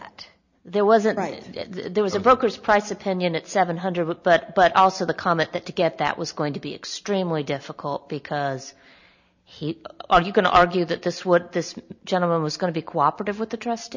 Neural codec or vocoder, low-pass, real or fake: none; 7.2 kHz; real